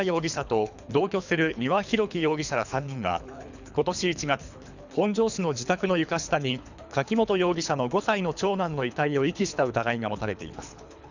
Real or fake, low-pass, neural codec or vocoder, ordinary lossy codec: fake; 7.2 kHz; codec, 24 kHz, 3 kbps, HILCodec; none